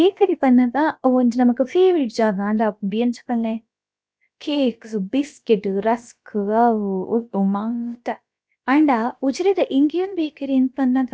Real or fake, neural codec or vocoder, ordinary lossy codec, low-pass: fake; codec, 16 kHz, about 1 kbps, DyCAST, with the encoder's durations; none; none